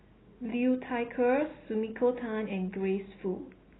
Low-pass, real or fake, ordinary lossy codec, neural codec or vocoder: 7.2 kHz; real; AAC, 16 kbps; none